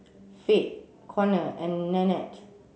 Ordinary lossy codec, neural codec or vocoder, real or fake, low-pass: none; none; real; none